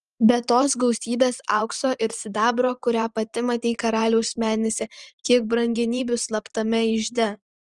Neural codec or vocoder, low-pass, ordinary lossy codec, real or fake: vocoder, 44.1 kHz, 128 mel bands every 512 samples, BigVGAN v2; 10.8 kHz; Opus, 24 kbps; fake